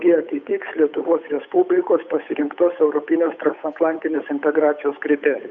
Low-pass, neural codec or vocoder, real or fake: 7.2 kHz; codec, 16 kHz, 8 kbps, FunCodec, trained on Chinese and English, 25 frames a second; fake